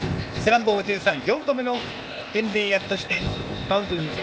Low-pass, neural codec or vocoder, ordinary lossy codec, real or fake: none; codec, 16 kHz, 0.8 kbps, ZipCodec; none; fake